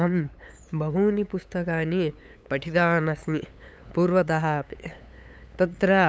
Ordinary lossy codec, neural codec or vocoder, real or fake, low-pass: none; codec, 16 kHz, 8 kbps, FunCodec, trained on LibriTTS, 25 frames a second; fake; none